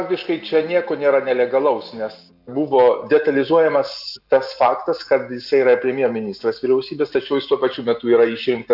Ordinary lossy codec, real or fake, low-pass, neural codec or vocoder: AAC, 48 kbps; real; 5.4 kHz; none